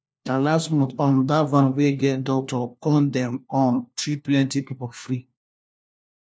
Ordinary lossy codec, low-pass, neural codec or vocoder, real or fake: none; none; codec, 16 kHz, 1 kbps, FunCodec, trained on LibriTTS, 50 frames a second; fake